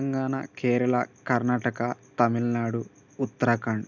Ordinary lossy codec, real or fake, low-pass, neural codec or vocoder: none; real; 7.2 kHz; none